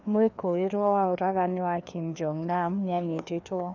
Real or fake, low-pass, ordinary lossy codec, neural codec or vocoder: fake; 7.2 kHz; none; codec, 16 kHz, 2 kbps, FreqCodec, larger model